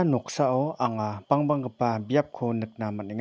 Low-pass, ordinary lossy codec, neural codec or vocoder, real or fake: none; none; none; real